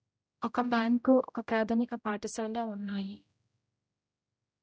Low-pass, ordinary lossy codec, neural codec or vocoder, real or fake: none; none; codec, 16 kHz, 0.5 kbps, X-Codec, HuBERT features, trained on general audio; fake